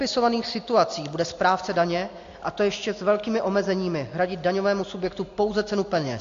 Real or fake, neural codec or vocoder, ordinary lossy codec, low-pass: real; none; AAC, 48 kbps; 7.2 kHz